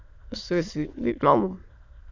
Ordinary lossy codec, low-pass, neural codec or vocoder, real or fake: Opus, 64 kbps; 7.2 kHz; autoencoder, 22.05 kHz, a latent of 192 numbers a frame, VITS, trained on many speakers; fake